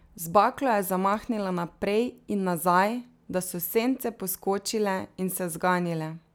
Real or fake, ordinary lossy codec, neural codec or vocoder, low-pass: real; none; none; none